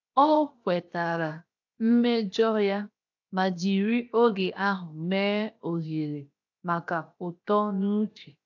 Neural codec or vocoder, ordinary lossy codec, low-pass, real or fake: codec, 16 kHz, 0.7 kbps, FocalCodec; AAC, 48 kbps; 7.2 kHz; fake